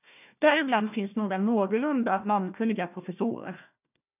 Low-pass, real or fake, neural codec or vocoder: 3.6 kHz; fake; codec, 16 kHz, 1 kbps, FunCodec, trained on Chinese and English, 50 frames a second